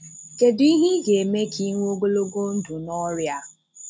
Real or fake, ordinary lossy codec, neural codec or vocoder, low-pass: real; none; none; none